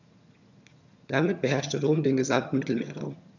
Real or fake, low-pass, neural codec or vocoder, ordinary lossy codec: fake; 7.2 kHz; vocoder, 22.05 kHz, 80 mel bands, HiFi-GAN; none